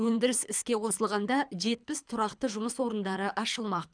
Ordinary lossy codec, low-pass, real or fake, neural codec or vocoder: none; 9.9 kHz; fake; codec, 24 kHz, 3 kbps, HILCodec